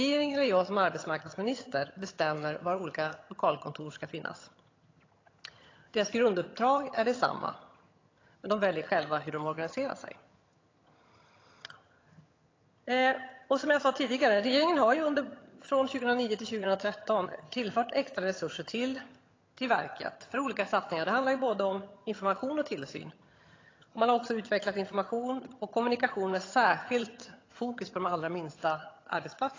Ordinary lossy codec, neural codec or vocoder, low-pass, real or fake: AAC, 32 kbps; vocoder, 22.05 kHz, 80 mel bands, HiFi-GAN; 7.2 kHz; fake